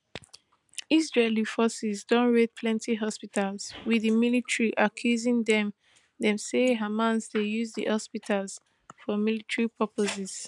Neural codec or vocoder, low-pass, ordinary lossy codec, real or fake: none; 10.8 kHz; none; real